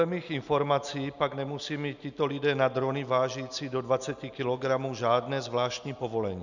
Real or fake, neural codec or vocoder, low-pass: real; none; 7.2 kHz